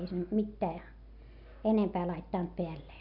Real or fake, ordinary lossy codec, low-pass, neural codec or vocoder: real; none; 5.4 kHz; none